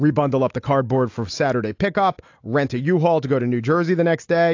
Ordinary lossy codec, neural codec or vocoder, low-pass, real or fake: AAC, 48 kbps; none; 7.2 kHz; real